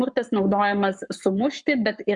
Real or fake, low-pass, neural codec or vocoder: fake; 10.8 kHz; codec, 44.1 kHz, 7.8 kbps, Pupu-Codec